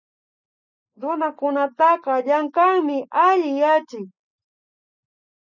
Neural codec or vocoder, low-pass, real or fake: none; 7.2 kHz; real